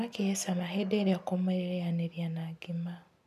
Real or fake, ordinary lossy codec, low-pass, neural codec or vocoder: real; none; 14.4 kHz; none